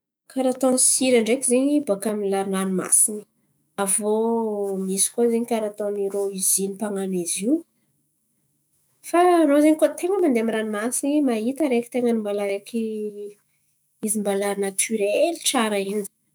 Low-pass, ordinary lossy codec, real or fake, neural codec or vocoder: none; none; fake; autoencoder, 48 kHz, 128 numbers a frame, DAC-VAE, trained on Japanese speech